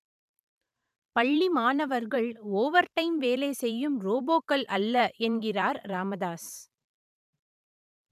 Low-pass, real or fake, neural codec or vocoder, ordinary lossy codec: 14.4 kHz; fake; vocoder, 44.1 kHz, 128 mel bands every 512 samples, BigVGAN v2; none